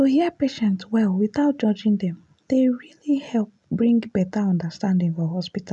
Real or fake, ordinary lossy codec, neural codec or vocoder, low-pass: real; none; none; 10.8 kHz